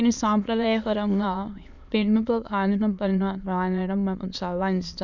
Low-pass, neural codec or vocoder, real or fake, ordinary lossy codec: 7.2 kHz; autoencoder, 22.05 kHz, a latent of 192 numbers a frame, VITS, trained on many speakers; fake; none